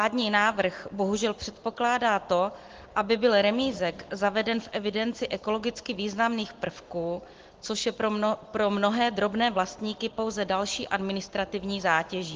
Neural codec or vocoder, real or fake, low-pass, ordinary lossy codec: none; real; 7.2 kHz; Opus, 16 kbps